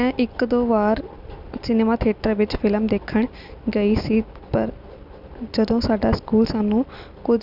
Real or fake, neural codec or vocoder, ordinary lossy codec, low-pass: real; none; none; 5.4 kHz